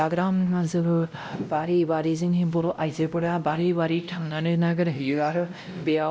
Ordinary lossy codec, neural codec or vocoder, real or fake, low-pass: none; codec, 16 kHz, 0.5 kbps, X-Codec, WavLM features, trained on Multilingual LibriSpeech; fake; none